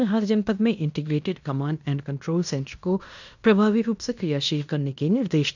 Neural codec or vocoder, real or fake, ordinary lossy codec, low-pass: codec, 16 kHz in and 24 kHz out, 0.9 kbps, LongCat-Audio-Codec, fine tuned four codebook decoder; fake; none; 7.2 kHz